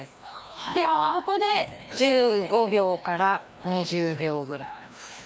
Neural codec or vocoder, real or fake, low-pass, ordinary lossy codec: codec, 16 kHz, 1 kbps, FreqCodec, larger model; fake; none; none